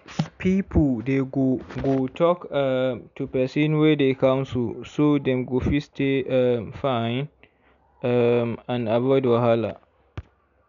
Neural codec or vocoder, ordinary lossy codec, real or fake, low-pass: none; MP3, 96 kbps; real; 7.2 kHz